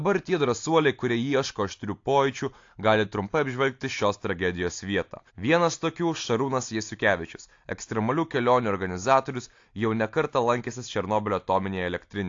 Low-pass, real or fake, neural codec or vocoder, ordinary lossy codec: 7.2 kHz; real; none; AAC, 48 kbps